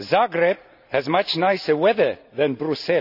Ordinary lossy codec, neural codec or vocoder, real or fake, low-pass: none; none; real; 5.4 kHz